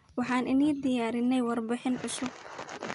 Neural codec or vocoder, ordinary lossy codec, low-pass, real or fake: none; none; 10.8 kHz; real